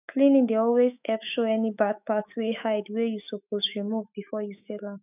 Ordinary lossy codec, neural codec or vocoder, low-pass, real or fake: none; autoencoder, 48 kHz, 128 numbers a frame, DAC-VAE, trained on Japanese speech; 3.6 kHz; fake